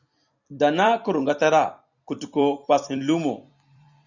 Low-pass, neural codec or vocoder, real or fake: 7.2 kHz; vocoder, 44.1 kHz, 128 mel bands every 256 samples, BigVGAN v2; fake